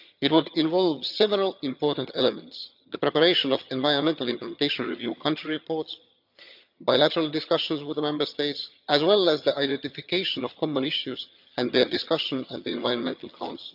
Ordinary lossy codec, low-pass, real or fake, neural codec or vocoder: none; 5.4 kHz; fake; vocoder, 22.05 kHz, 80 mel bands, HiFi-GAN